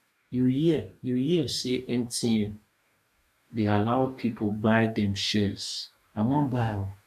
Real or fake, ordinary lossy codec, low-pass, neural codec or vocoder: fake; none; 14.4 kHz; codec, 44.1 kHz, 2.6 kbps, DAC